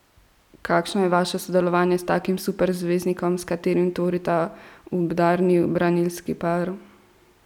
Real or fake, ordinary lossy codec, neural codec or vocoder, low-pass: real; none; none; 19.8 kHz